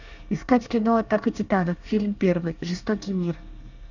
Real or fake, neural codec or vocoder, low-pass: fake; codec, 24 kHz, 1 kbps, SNAC; 7.2 kHz